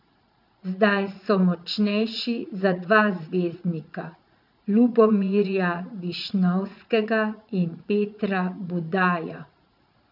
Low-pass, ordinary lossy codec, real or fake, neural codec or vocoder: 5.4 kHz; none; fake; vocoder, 44.1 kHz, 80 mel bands, Vocos